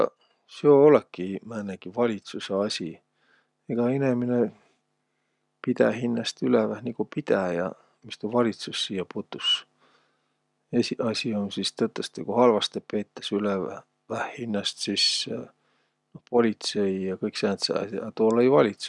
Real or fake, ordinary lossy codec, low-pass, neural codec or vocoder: real; none; 10.8 kHz; none